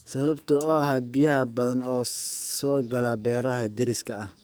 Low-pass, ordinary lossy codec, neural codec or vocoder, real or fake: none; none; codec, 44.1 kHz, 2.6 kbps, SNAC; fake